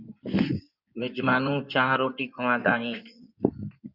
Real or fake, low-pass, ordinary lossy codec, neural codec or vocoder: fake; 5.4 kHz; Opus, 64 kbps; codec, 16 kHz in and 24 kHz out, 2.2 kbps, FireRedTTS-2 codec